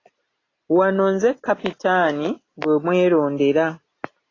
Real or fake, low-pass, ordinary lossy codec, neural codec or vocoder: real; 7.2 kHz; AAC, 32 kbps; none